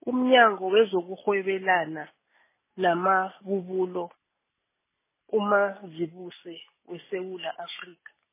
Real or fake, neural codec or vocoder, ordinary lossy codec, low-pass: real; none; MP3, 16 kbps; 3.6 kHz